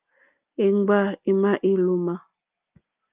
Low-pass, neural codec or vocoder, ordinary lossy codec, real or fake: 3.6 kHz; none; Opus, 32 kbps; real